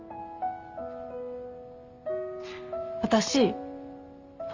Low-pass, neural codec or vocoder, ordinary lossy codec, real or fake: 7.2 kHz; none; Opus, 32 kbps; real